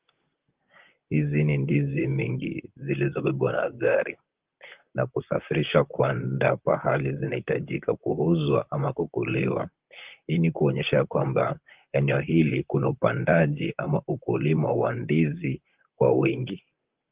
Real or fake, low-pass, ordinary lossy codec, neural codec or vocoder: fake; 3.6 kHz; Opus, 32 kbps; vocoder, 44.1 kHz, 128 mel bands, Pupu-Vocoder